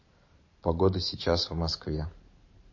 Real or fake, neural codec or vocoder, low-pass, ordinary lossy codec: fake; vocoder, 44.1 kHz, 128 mel bands every 512 samples, BigVGAN v2; 7.2 kHz; MP3, 32 kbps